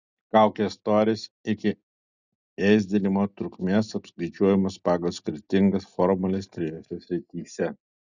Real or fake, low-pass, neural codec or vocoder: real; 7.2 kHz; none